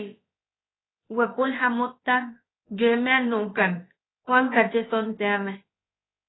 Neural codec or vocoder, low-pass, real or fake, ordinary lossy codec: codec, 16 kHz, about 1 kbps, DyCAST, with the encoder's durations; 7.2 kHz; fake; AAC, 16 kbps